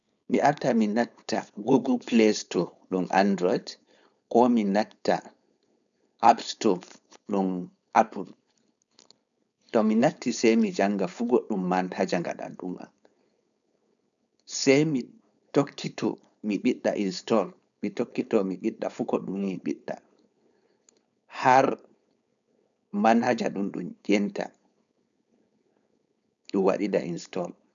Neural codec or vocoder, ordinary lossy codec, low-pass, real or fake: codec, 16 kHz, 4.8 kbps, FACodec; none; 7.2 kHz; fake